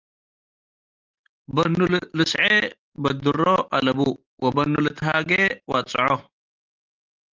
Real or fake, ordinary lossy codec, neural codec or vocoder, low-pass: real; Opus, 32 kbps; none; 7.2 kHz